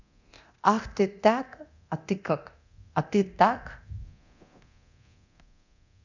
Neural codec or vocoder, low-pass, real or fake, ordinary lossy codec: codec, 24 kHz, 0.9 kbps, DualCodec; 7.2 kHz; fake; none